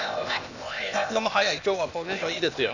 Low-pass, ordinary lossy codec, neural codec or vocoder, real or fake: 7.2 kHz; none; codec, 16 kHz, 0.8 kbps, ZipCodec; fake